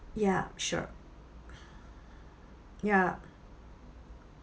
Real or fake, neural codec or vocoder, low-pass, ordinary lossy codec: real; none; none; none